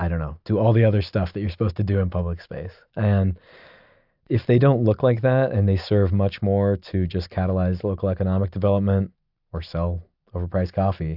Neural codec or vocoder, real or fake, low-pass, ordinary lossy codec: none; real; 5.4 kHz; AAC, 48 kbps